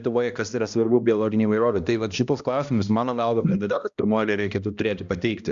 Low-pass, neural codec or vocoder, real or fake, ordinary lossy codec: 7.2 kHz; codec, 16 kHz, 1 kbps, X-Codec, HuBERT features, trained on balanced general audio; fake; Opus, 64 kbps